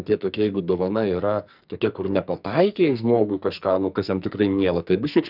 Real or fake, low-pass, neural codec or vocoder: fake; 5.4 kHz; codec, 44.1 kHz, 2.6 kbps, SNAC